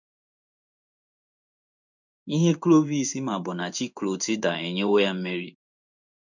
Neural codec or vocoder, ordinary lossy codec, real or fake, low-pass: codec, 16 kHz in and 24 kHz out, 1 kbps, XY-Tokenizer; none; fake; 7.2 kHz